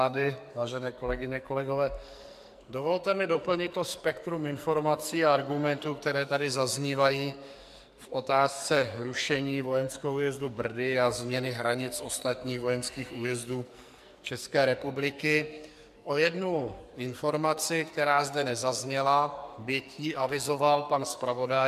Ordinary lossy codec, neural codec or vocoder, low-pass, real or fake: MP3, 96 kbps; codec, 44.1 kHz, 2.6 kbps, SNAC; 14.4 kHz; fake